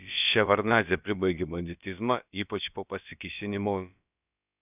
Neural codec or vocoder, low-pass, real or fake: codec, 16 kHz, about 1 kbps, DyCAST, with the encoder's durations; 3.6 kHz; fake